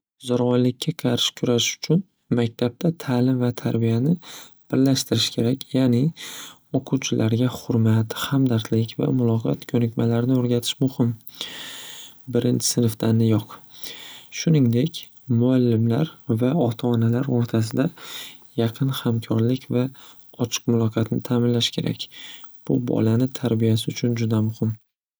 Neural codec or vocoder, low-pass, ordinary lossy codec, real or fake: none; none; none; real